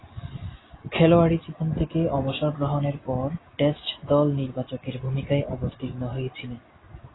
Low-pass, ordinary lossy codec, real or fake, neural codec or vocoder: 7.2 kHz; AAC, 16 kbps; real; none